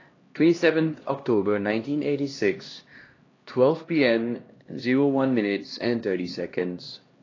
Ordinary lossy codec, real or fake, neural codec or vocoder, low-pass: AAC, 32 kbps; fake; codec, 16 kHz, 1 kbps, X-Codec, HuBERT features, trained on LibriSpeech; 7.2 kHz